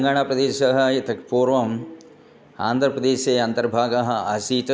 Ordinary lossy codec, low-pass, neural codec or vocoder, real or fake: none; none; none; real